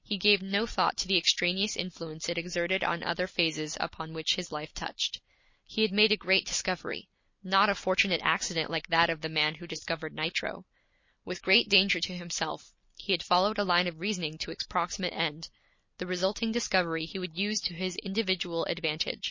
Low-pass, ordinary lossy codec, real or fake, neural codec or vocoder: 7.2 kHz; MP3, 32 kbps; real; none